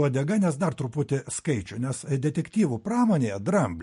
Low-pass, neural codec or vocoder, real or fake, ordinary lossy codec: 10.8 kHz; none; real; MP3, 48 kbps